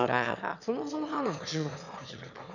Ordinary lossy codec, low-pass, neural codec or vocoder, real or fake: none; 7.2 kHz; autoencoder, 22.05 kHz, a latent of 192 numbers a frame, VITS, trained on one speaker; fake